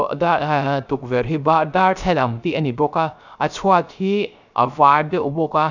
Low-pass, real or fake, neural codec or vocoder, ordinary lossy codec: 7.2 kHz; fake; codec, 16 kHz, 0.3 kbps, FocalCodec; none